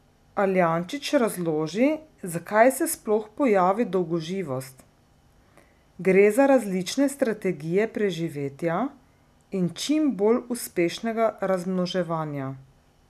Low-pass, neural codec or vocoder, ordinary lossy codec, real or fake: 14.4 kHz; none; none; real